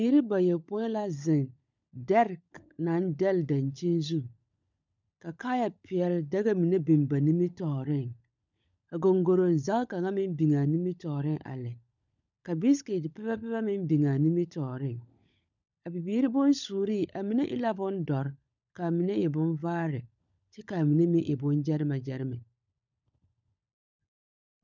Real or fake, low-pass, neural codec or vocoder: fake; 7.2 kHz; codec, 16 kHz, 16 kbps, FunCodec, trained on LibriTTS, 50 frames a second